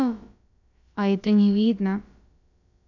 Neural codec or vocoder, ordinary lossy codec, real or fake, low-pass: codec, 16 kHz, about 1 kbps, DyCAST, with the encoder's durations; none; fake; 7.2 kHz